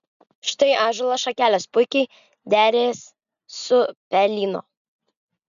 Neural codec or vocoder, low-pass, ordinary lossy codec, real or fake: none; 7.2 kHz; MP3, 96 kbps; real